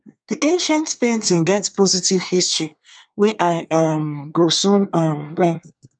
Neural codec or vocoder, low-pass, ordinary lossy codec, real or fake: codec, 24 kHz, 1 kbps, SNAC; 9.9 kHz; none; fake